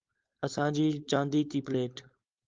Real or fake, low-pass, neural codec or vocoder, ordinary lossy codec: fake; 7.2 kHz; codec, 16 kHz, 4.8 kbps, FACodec; Opus, 32 kbps